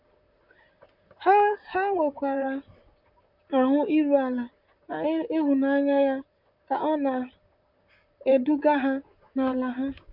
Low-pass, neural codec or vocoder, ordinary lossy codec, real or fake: 5.4 kHz; vocoder, 44.1 kHz, 128 mel bands, Pupu-Vocoder; none; fake